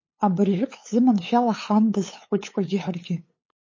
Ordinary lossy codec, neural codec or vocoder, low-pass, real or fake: MP3, 32 kbps; codec, 16 kHz, 8 kbps, FunCodec, trained on LibriTTS, 25 frames a second; 7.2 kHz; fake